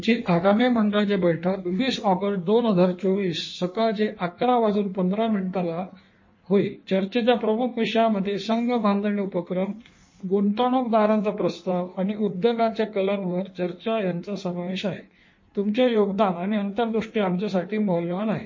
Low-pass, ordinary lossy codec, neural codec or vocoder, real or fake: 7.2 kHz; MP3, 32 kbps; codec, 16 kHz in and 24 kHz out, 1.1 kbps, FireRedTTS-2 codec; fake